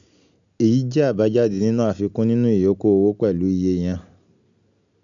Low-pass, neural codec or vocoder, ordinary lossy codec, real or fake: 7.2 kHz; none; none; real